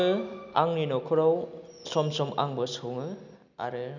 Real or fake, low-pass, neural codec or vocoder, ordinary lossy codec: real; 7.2 kHz; none; none